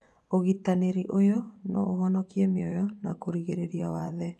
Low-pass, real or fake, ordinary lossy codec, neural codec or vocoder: none; fake; none; vocoder, 24 kHz, 100 mel bands, Vocos